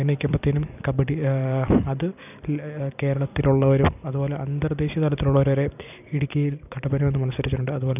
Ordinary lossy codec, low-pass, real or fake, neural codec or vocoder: none; 3.6 kHz; fake; vocoder, 44.1 kHz, 128 mel bands every 256 samples, BigVGAN v2